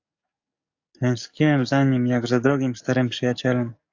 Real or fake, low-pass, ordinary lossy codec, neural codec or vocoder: fake; 7.2 kHz; AAC, 48 kbps; codec, 44.1 kHz, 7.8 kbps, DAC